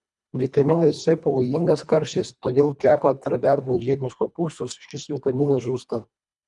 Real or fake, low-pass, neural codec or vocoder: fake; 10.8 kHz; codec, 24 kHz, 1.5 kbps, HILCodec